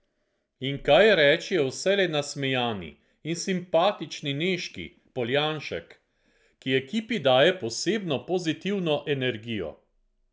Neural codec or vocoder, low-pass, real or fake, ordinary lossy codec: none; none; real; none